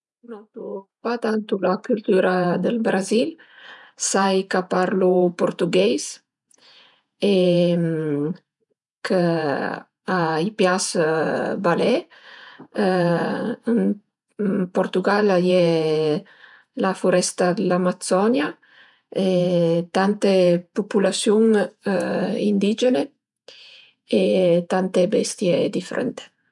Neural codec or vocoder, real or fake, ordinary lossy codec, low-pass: vocoder, 48 kHz, 128 mel bands, Vocos; fake; none; 10.8 kHz